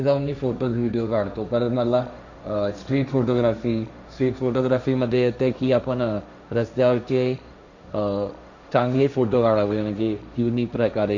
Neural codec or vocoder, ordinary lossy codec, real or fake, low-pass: codec, 16 kHz, 1.1 kbps, Voila-Tokenizer; none; fake; 7.2 kHz